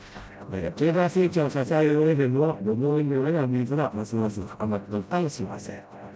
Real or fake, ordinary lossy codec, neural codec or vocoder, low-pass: fake; none; codec, 16 kHz, 0.5 kbps, FreqCodec, smaller model; none